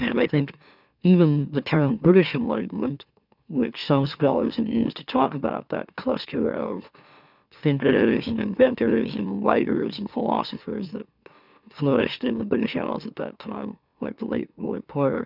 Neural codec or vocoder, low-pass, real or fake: autoencoder, 44.1 kHz, a latent of 192 numbers a frame, MeloTTS; 5.4 kHz; fake